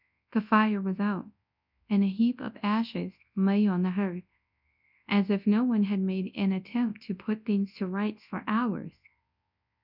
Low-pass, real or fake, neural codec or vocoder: 5.4 kHz; fake; codec, 24 kHz, 0.9 kbps, WavTokenizer, large speech release